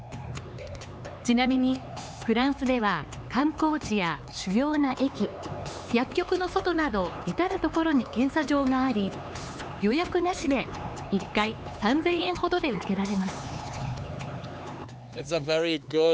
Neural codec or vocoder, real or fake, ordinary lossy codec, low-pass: codec, 16 kHz, 4 kbps, X-Codec, HuBERT features, trained on LibriSpeech; fake; none; none